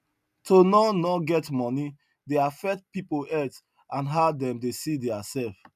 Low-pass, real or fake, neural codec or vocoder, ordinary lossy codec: 14.4 kHz; real; none; none